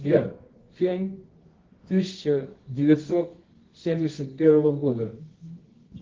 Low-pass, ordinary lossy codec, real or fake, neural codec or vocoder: 7.2 kHz; Opus, 16 kbps; fake; codec, 24 kHz, 0.9 kbps, WavTokenizer, medium music audio release